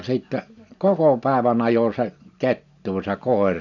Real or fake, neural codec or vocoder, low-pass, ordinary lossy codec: real; none; 7.2 kHz; none